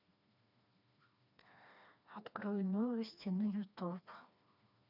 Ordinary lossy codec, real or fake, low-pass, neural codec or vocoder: none; fake; 5.4 kHz; codec, 16 kHz, 2 kbps, FreqCodec, smaller model